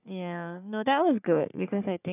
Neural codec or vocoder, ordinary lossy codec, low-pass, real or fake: codec, 44.1 kHz, 3.4 kbps, Pupu-Codec; none; 3.6 kHz; fake